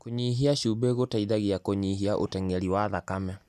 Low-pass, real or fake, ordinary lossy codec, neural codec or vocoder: 14.4 kHz; real; none; none